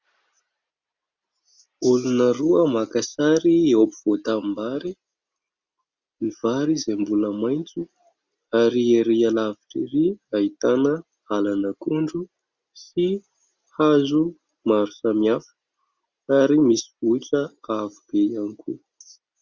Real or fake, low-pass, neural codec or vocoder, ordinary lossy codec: real; 7.2 kHz; none; Opus, 64 kbps